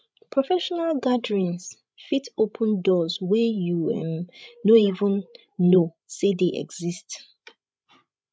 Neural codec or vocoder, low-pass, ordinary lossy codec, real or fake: codec, 16 kHz, 16 kbps, FreqCodec, larger model; none; none; fake